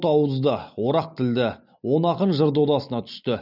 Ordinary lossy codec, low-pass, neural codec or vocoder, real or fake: none; 5.4 kHz; none; real